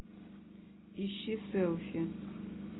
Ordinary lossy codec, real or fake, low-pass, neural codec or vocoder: AAC, 16 kbps; real; 7.2 kHz; none